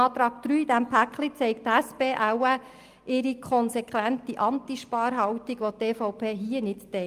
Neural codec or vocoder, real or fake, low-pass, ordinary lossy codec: none; real; 14.4 kHz; Opus, 32 kbps